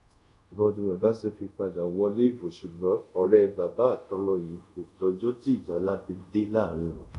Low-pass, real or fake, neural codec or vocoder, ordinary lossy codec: 10.8 kHz; fake; codec, 24 kHz, 0.5 kbps, DualCodec; none